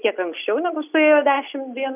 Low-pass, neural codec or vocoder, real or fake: 3.6 kHz; none; real